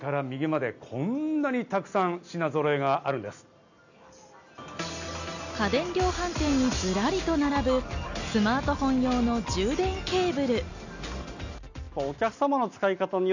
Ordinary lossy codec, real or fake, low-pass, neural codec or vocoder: none; real; 7.2 kHz; none